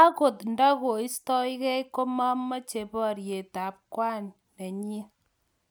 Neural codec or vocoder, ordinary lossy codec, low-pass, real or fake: none; none; none; real